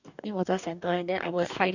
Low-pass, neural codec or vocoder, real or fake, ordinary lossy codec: 7.2 kHz; codec, 44.1 kHz, 2.6 kbps, DAC; fake; none